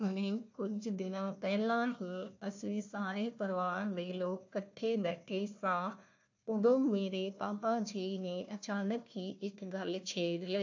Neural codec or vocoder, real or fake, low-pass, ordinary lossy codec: codec, 16 kHz, 1 kbps, FunCodec, trained on Chinese and English, 50 frames a second; fake; 7.2 kHz; AAC, 48 kbps